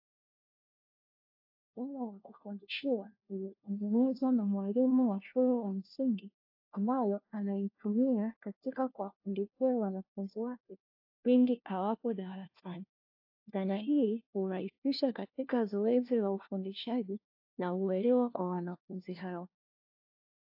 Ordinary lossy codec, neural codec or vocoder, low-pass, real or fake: AAC, 48 kbps; codec, 16 kHz, 1 kbps, FunCodec, trained on LibriTTS, 50 frames a second; 5.4 kHz; fake